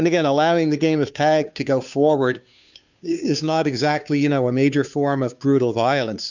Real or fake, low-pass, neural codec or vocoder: fake; 7.2 kHz; codec, 16 kHz, 2 kbps, FunCodec, trained on Chinese and English, 25 frames a second